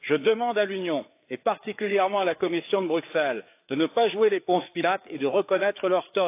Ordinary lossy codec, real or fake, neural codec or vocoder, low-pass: AAC, 24 kbps; fake; codec, 16 kHz, 4 kbps, FreqCodec, larger model; 3.6 kHz